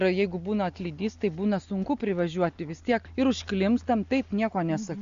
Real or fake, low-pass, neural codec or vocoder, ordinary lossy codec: real; 7.2 kHz; none; Opus, 64 kbps